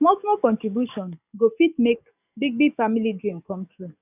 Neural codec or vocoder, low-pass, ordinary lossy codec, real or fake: codec, 44.1 kHz, 7.8 kbps, DAC; 3.6 kHz; none; fake